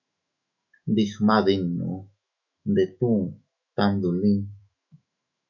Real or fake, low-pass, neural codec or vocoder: fake; 7.2 kHz; autoencoder, 48 kHz, 128 numbers a frame, DAC-VAE, trained on Japanese speech